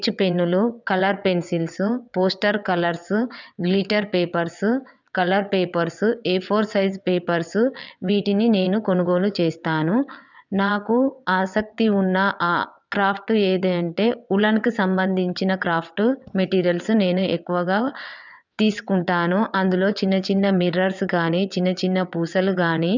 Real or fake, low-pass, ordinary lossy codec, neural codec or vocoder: fake; 7.2 kHz; none; vocoder, 22.05 kHz, 80 mel bands, WaveNeXt